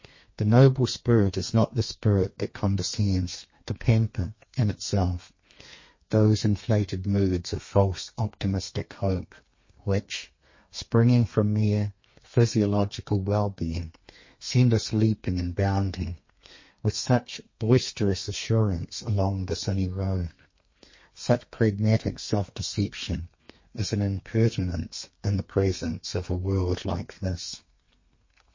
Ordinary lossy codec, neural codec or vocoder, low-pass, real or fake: MP3, 32 kbps; codec, 32 kHz, 1.9 kbps, SNAC; 7.2 kHz; fake